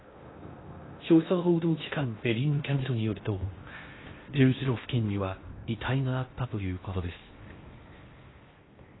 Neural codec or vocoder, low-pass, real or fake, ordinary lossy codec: codec, 16 kHz in and 24 kHz out, 0.6 kbps, FocalCodec, streaming, 2048 codes; 7.2 kHz; fake; AAC, 16 kbps